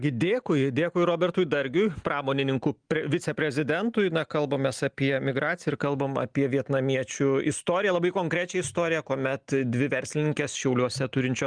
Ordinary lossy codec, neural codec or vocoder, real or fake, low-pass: Opus, 24 kbps; none; real; 9.9 kHz